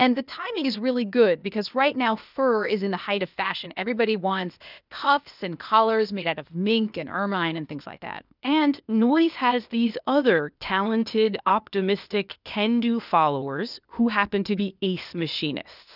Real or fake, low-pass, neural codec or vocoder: fake; 5.4 kHz; codec, 16 kHz, 0.8 kbps, ZipCodec